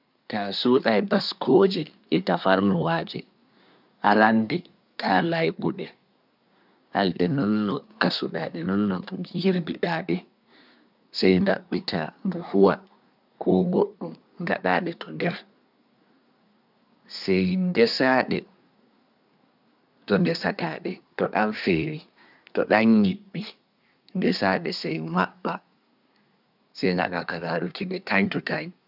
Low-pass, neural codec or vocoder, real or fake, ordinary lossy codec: 5.4 kHz; codec, 24 kHz, 1 kbps, SNAC; fake; none